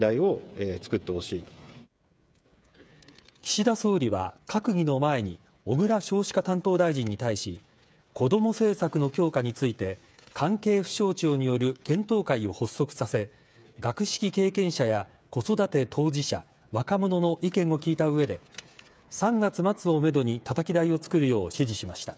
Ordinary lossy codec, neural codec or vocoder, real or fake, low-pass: none; codec, 16 kHz, 8 kbps, FreqCodec, smaller model; fake; none